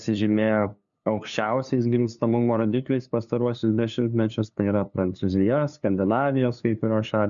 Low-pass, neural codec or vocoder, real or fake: 7.2 kHz; codec, 16 kHz, 2 kbps, FunCodec, trained on LibriTTS, 25 frames a second; fake